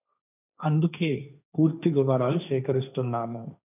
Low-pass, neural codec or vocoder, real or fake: 3.6 kHz; codec, 16 kHz, 1.1 kbps, Voila-Tokenizer; fake